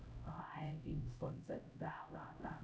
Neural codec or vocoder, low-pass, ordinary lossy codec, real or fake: codec, 16 kHz, 0.5 kbps, X-Codec, HuBERT features, trained on LibriSpeech; none; none; fake